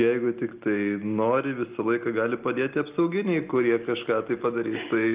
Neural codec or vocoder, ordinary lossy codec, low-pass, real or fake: none; Opus, 32 kbps; 3.6 kHz; real